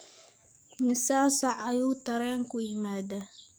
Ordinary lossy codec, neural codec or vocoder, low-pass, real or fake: none; codec, 44.1 kHz, 7.8 kbps, DAC; none; fake